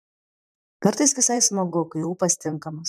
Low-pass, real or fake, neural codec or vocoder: 14.4 kHz; fake; vocoder, 44.1 kHz, 128 mel bands, Pupu-Vocoder